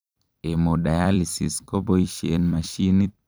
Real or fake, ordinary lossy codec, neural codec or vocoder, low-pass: fake; none; vocoder, 44.1 kHz, 128 mel bands every 256 samples, BigVGAN v2; none